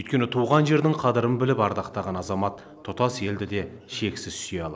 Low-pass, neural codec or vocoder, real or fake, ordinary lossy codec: none; none; real; none